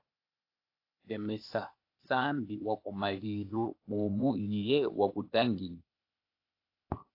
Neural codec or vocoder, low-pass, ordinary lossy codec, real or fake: codec, 16 kHz, 0.8 kbps, ZipCodec; 5.4 kHz; AAC, 32 kbps; fake